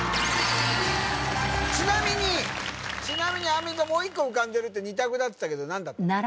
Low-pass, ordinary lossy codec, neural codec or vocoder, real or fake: none; none; none; real